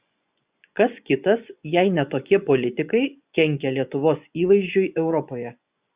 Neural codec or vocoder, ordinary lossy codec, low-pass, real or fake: vocoder, 24 kHz, 100 mel bands, Vocos; Opus, 64 kbps; 3.6 kHz; fake